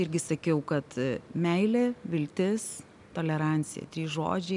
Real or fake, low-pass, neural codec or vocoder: real; 10.8 kHz; none